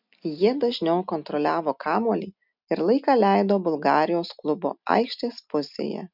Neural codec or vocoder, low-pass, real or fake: none; 5.4 kHz; real